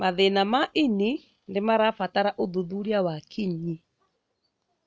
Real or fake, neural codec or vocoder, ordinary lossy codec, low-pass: real; none; none; none